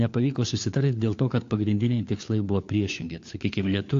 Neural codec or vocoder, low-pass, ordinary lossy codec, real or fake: codec, 16 kHz, 4 kbps, FunCodec, trained on LibriTTS, 50 frames a second; 7.2 kHz; AAC, 48 kbps; fake